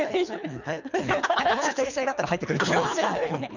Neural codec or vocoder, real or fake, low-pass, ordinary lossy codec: codec, 24 kHz, 3 kbps, HILCodec; fake; 7.2 kHz; none